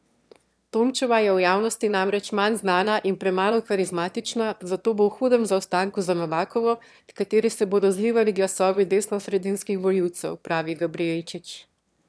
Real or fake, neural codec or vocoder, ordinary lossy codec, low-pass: fake; autoencoder, 22.05 kHz, a latent of 192 numbers a frame, VITS, trained on one speaker; none; none